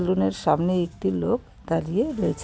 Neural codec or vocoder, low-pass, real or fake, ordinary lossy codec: none; none; real; none